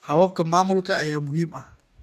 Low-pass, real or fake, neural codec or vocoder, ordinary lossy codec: 14.4 kHz; fake; codec, 44.1 kHz, 2.6 kbps, DAC; none